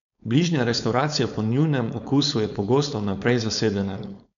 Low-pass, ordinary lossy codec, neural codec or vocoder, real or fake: 7.2 kHz; none; codec, 16 kHz, 4.8 kbps, FACodec; fake